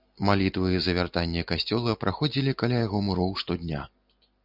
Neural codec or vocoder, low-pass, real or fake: none; 5.4 kHz; real